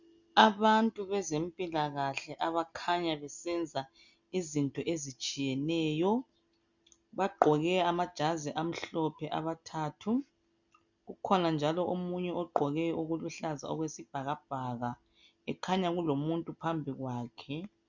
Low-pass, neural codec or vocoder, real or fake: 7.2 kHz; none; real